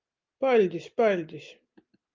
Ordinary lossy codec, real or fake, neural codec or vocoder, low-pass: Opus, 32 kbps; real; none; 7.2 kHz